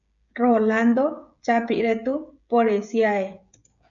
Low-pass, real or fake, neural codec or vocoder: 7.2 kHz; fake; codec, 16 kHz, 16 kbps, FreqCodec, smaller model